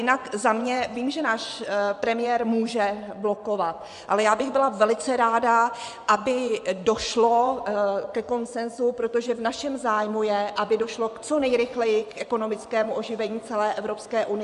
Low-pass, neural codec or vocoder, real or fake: 10.8 kHz; vocoder, 24 kHz, 100 mel bands, Vocos; fake